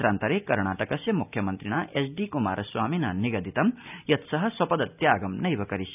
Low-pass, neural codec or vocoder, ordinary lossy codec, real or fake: 3.6 kHz; none; none; real